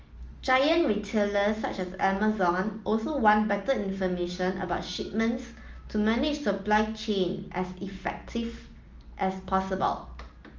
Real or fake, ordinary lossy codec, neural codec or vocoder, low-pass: real; Opus, 24 kbps; none; 7.2 kHz